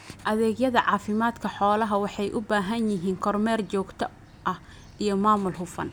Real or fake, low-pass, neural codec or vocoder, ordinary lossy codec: real; none; none; none